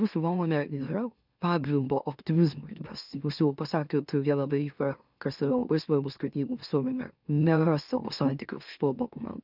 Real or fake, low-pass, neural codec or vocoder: fake; 5.4 kHz; autoencoder, 44.1 kHz, a latent of 192 numbers a frame, MeloTTS